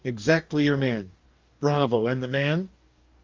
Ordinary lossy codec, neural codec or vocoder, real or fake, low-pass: Opus, 32 kbps; codec, 44.1 kHz, 2.6 kbps, DAC; fake; 7.2 kHz